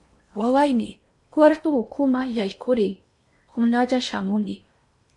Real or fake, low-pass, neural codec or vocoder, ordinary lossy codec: fake; 10.8 kHz; codec, 16 kHz in and 24 kHz out, 0.8 kbps, FocalCodec, streaming, 65536 codes; MP3, 48 kbps